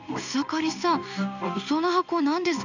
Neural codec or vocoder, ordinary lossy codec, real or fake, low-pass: codec, 16 kHz in and 24 kHz out, 1 kbps, XY-Tokenizer; none; fake; 7.2 kHz